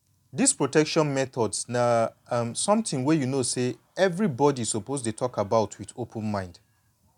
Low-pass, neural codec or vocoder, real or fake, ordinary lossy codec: 19.8 kHz; none; real; none